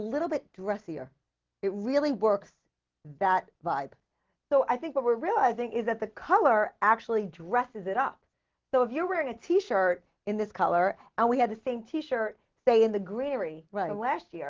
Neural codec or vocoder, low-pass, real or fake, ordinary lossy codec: none; 7.2 kHz; real; Opus, 16 kbps